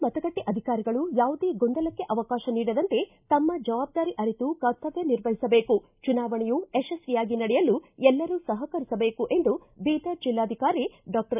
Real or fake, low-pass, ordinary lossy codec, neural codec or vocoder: real; 3.6 kHz; none; none